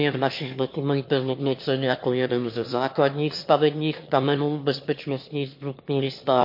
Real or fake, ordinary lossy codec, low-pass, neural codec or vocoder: fake; MP3, 32 kbps; 5.4 kHz; autoencoder, 22.05 kHz, a latent of 192 numbers a frame, VITS, trained on one speaker